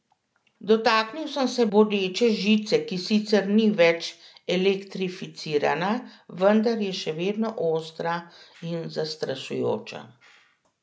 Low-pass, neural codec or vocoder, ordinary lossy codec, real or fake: none; none; none; real